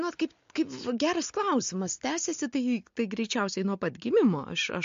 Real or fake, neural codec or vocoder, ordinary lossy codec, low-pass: real; none; MP3, 48 kbps; 7.2 kHz